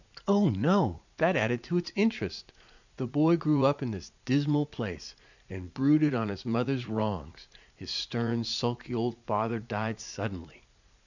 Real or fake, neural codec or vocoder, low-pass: fake; vocoder, 44.1 kHz, 80 mel bands, Vocos; 7.2 kHz